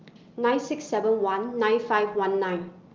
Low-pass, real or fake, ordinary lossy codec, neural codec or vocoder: 7.2 kHz; real; Opus, 32 kbps; none